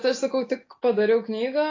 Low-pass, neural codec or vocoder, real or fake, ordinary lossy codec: 7.2 kHz; none; real; AAC, 32 kbps